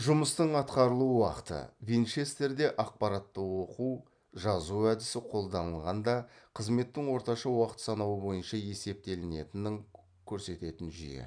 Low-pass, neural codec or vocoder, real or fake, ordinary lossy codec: 9.9 kHz; none; real; none